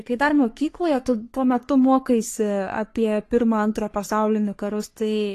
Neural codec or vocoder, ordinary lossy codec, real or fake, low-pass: codec, 44.1 kHz, 3.4 kbps, Pupu-Codec; AAC, 48 kbps; fake; 14.4 kHz